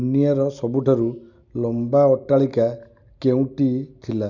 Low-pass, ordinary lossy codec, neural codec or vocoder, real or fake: 7.2 kHz; none; none; real